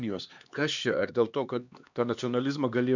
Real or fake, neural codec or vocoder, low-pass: fake; codec, 16 kHz, 2 kbps, X-Codec, HuBERT features, trained on LibriSpeech; 7.2 kHz